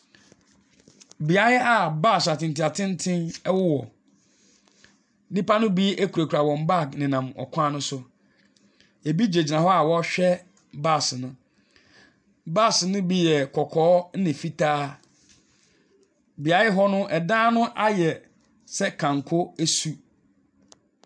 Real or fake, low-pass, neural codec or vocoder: real; 9.9 kHz; none